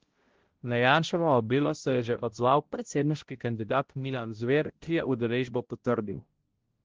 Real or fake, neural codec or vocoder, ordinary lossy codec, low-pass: fake; codec, 16 kHz, 0.5 kbps, X-Codec, HuBERT features, trained on balanced general audio; Opus, 16 kbps; 7.2 kHz